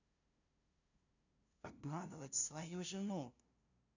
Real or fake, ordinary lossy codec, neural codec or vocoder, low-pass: fake; none; codec, 16 kHz, 0.5 kbps, FunCodec, trained on LibriTTS, 25 frames a second; 7.2 kHz